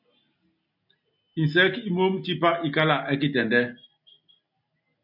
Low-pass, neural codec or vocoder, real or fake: 5.4 kHz; none; real